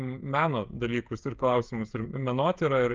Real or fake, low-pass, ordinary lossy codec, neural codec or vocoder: fake; 7.2 kHz; Opus, 24 kbps; codec, 16 kHz, 8 kbps, FreqCodec, smaller model